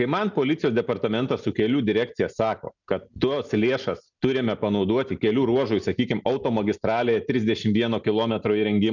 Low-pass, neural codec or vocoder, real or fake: 7.2 kHz; none; real